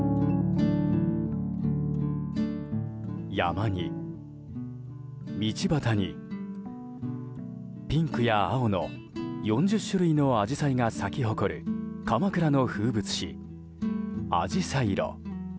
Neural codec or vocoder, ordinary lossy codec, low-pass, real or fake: none; none; none; real